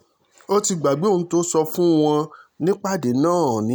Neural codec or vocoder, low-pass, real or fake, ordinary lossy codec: none; none; real; none